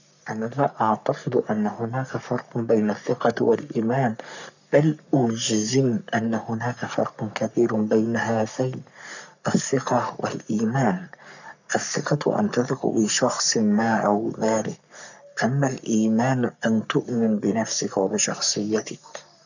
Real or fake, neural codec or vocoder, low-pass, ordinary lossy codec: fake; codec, 44.1 kHz, 3.4 kbps, Pupu-Codec; 7.2 kHz; none